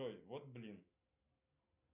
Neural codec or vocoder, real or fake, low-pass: none; real; 3.6 kHz